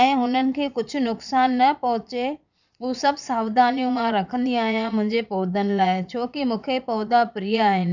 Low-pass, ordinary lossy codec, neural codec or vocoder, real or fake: 7.2 kHz; none; vocoder, 22.05 kHz, 80 mel bands, Vocos; fake